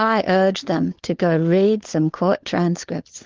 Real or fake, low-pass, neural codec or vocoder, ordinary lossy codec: fake; 7.2 kHz; codec, 16 kHz, 4 kbps, FunCodec, trained on LibriTTS, 50 frames a second; Opus, 16 kbps